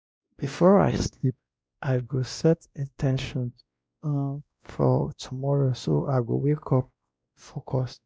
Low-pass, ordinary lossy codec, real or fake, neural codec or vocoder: none; none; fake; codec, 16 kHz, 1 kbps, X-Codec, WavLM features, trained on Multilingual LibriSpeech